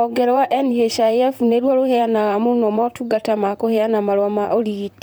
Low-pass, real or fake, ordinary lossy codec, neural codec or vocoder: none; fake; none; vocoder, 44.1 kHz, 128 mel bands, Pupu-Vocoder